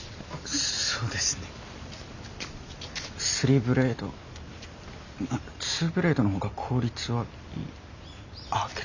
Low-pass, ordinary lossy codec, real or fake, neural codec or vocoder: 7.2 kHz; none; real; none